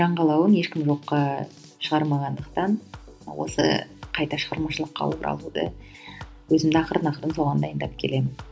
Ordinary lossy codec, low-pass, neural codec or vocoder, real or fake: none; none; none; real